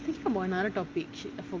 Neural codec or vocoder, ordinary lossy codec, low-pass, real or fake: none; Opus, 32 kbps; 7.2 kHz; real